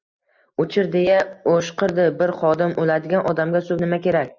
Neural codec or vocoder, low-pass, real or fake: none; 7.2 kHz; real